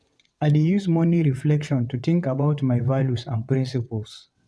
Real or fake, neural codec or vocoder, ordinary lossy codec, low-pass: fake; vocoder, 22.05 kHz, 80 mel bands, WaveNeXt; none; none